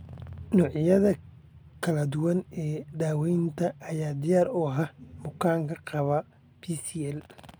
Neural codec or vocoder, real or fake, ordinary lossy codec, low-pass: none; real; none; none